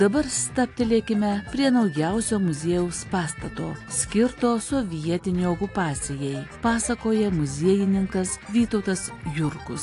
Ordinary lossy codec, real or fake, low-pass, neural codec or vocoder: AAC, 48 kbps; real; 10.8 kHz; none